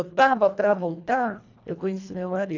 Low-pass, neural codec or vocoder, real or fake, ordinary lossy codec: 7.2 kHz; codec, 24 kHz, 1.5 kbps, HILCodec; fake; none